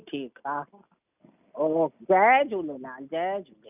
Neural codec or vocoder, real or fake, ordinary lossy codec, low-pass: none; real; none; 3.6 kHz